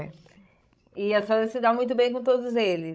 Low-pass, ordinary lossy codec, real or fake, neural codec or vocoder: none; none; fake; codec, 16 kHz, 16 kbps, FreqCodec, larger model